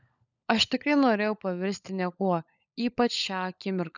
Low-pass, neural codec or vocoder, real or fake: 7.2 kHz; codec, 16 kHz, 16 kbps, FunCodec, trained on LibriTTS, 50 frames a second; fake